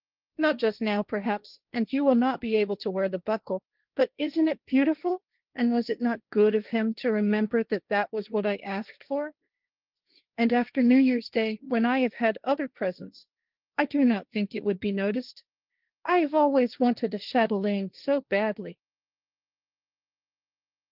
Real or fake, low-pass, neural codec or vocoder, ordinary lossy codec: fake; 5.4 kHz; codec, 16 kHz, 1.1 kbps, Voila-Tokenizer; Opus, 32 kbps